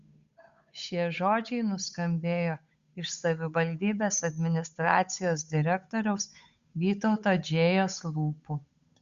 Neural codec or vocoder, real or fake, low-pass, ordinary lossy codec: codec, 16 kHz, 8 kbps, FunCodec, trained on Chinese and English, 25 frames a second; fake; 7.2 kHz; Opus, 64 kbps